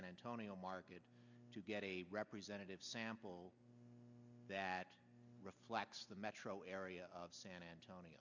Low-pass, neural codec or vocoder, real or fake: 7.2 kHz; none; real